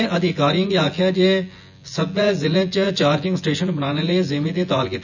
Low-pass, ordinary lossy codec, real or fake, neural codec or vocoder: 7.2 kHz; none; fake; vocoder, 24 kHz, 100 mel bands, Vocos